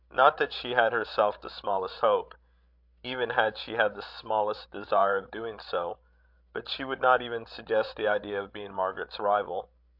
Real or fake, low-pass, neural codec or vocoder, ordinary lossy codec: fake; 5.4 kHz; codec, 16 kHz, 16 kbps, FreqCodec, larger model; AAC, 48 kbps